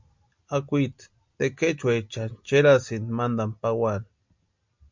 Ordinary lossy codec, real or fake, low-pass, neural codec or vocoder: MP3, 64 kbps; real; 7.2 kHz; none